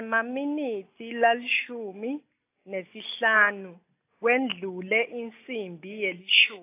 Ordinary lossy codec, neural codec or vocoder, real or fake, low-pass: AAC, 24 kbps; none; real; 3.6 kHz